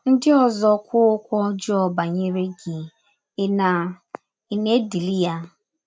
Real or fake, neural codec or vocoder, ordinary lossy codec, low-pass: real; none; none; none